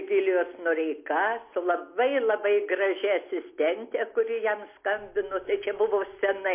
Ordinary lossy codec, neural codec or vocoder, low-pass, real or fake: MP3, 24 kbps; none; 3.6 kHz; real